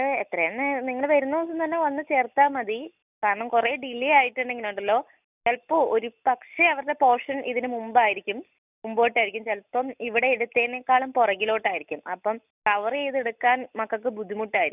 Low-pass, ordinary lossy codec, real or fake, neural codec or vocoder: 3.6 kHz; none; real; none